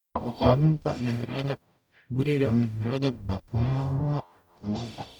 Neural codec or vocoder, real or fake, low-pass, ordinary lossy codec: codec, 44.1 kHz, 0.9 kbps, DAC; fake; 19.8 kHz; none